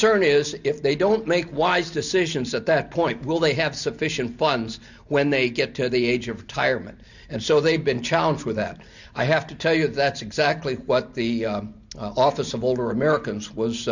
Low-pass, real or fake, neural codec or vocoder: 7.2 kHz; real; none